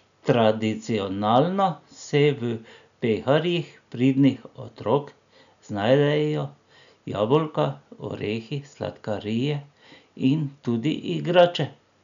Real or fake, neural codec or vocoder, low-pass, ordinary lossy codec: real; none; 7.2 kHz; none